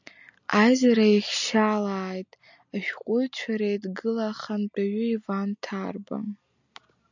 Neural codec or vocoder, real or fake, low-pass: none; real; 7.2 kHz